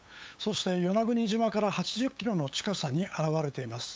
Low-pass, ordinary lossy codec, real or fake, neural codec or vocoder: none; none; fake; codec, 16 kHz, 8 kbps, FunCodec, trained on LibriTTS, 25 frames a second